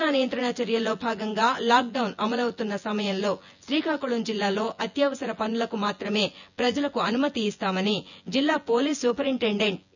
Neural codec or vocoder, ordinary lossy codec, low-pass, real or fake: vocoder, 24 kHz, 100 mel bands, Vocos; none; 7.2 kHz; fake